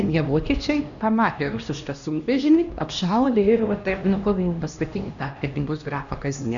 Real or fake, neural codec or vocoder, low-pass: fake; codec, 16 kHz, 1 kbps, X-Codec, HuBERT features, trained on LibriSpeech; 7.2 kHz